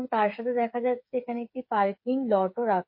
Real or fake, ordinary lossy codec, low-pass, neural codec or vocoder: fake; MP3, 32 kbps; 5.4 kHz; codec, 16 kHz, 8 kbps, FreqCodec, smaller model